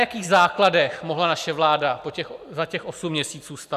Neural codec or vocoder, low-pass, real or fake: none; 14.4 kHz; real